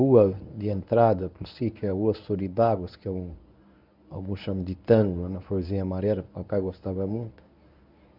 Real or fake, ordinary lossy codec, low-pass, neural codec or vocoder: fake; none; 5.4 kHz; codec, 24 kHz, 0.9 kbps, WavTokenizer, medium speech release version 1